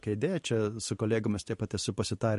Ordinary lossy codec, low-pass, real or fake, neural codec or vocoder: MP3, 48 kbps; 14.4 kHz; fake; vocoder, 44.1 kHz, 128 mel bands every 512 samples, BigVGAN v2